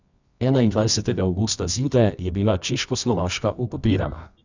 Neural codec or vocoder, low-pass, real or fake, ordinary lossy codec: codec, 24 kHz, 0.9 kbps, WavTokenizer, medium music audio release; 7.2 kHz; fake; none